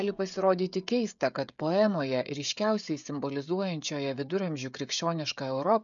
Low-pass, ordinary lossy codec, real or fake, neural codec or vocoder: 7.2 kHz; MP3, 96 kbps; fake; codec, 16 kHz, 16 kbps, FreqCodec, smaller model